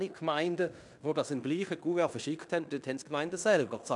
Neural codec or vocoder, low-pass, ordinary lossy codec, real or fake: codec, 16 kHz in and 24 kHz out, 0.9 kbps, LongCat-Audio-Codec, fine tuned four codebook decoder; 10.8 kHz; none; fake